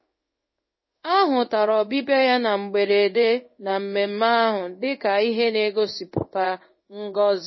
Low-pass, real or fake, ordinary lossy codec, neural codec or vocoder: 7.2 kHz; fake; MP3, 24 kbps; codec, 16 kHz in and 24 kHz out, 1 kbps, XY-Tokenizer